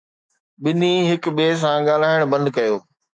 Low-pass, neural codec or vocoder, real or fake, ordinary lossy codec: 9.9 kHz; autoencoder, 48 kHz, 128 numbers a frame, DAC-VAE, trained on Japanese speech; fake; MP3, 96 kbps